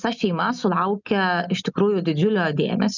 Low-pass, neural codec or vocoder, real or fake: 7.2 kHz; none; real